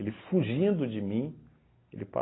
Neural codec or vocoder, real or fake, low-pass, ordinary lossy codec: none; real; 7.2 kHz; AAC, 16 kbps